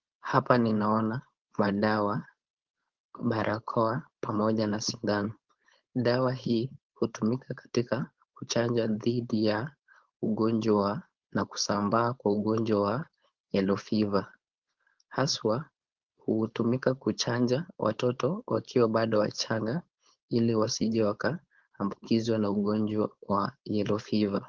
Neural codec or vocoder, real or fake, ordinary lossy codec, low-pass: codec, 16 kHz, 4.8 kbps, FACodec; fake; Opus, 16 kbps; 7.2 kHz